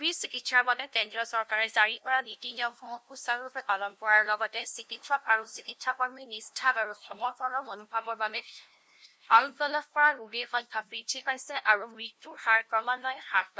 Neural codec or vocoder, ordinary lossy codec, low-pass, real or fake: codec, 16 kHz, 0.5 kbps, FunCodec, trained on LibriTTS, 25 frames a second; none; none; fake